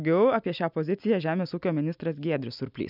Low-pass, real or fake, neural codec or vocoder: 5.4 kHz; real; none